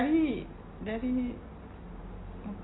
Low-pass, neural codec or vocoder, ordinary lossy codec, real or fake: 7.2 kHz; none; AAC, 16 kbps; real